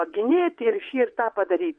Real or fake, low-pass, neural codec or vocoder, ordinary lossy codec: fake; 9.9 kHz; vocoder, 22.05 kHz, 80 mel bands, WaveNeXt; MP3, 48 kbps